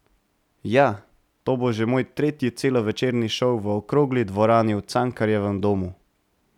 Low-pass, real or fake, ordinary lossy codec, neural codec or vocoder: 19.8 kHz; real; none; none